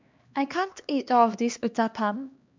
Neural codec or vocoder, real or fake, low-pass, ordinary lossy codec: codec, 16 kHz, 2 kbps, X-Codec, HuBERT features, trained on LibriSpeech; fake; 7.2 kHz; MP3, 64 kbps